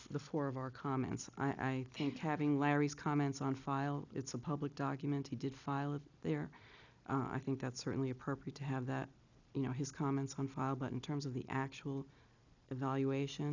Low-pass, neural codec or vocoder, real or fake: 7.2 kHz; none; real